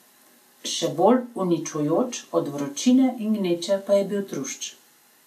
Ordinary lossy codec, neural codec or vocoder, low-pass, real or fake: none; none; 14.4 kHz; real